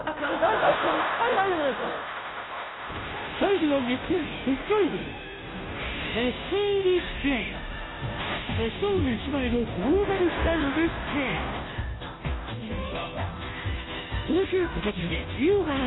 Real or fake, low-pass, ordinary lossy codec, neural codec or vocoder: fake; 7.2 kHz; AAC, 16 kbps; codec, 16 kHz, 0.5 kbps, FunCodec, trained on Chinese and English, 25 frames a second